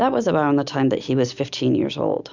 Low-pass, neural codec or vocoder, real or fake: 7.2 kHz; none; real